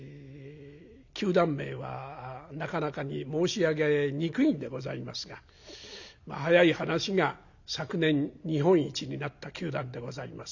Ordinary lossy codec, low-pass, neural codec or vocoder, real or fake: none; 7.2 kHz; none; real